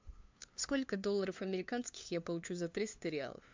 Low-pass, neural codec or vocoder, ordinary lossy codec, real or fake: 7.2 kHz; codec, 16 kHz, 2 kbps, FunCodec, trained on LibriTTS, 25 frames a second; MP3, 64 kbps; fake